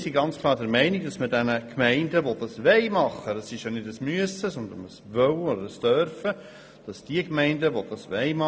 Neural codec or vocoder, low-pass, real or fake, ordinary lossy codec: none; none; real; none